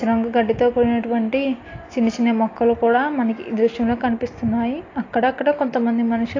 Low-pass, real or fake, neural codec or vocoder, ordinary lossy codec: 7.2 kHz; real; none; AAC, 32 kbps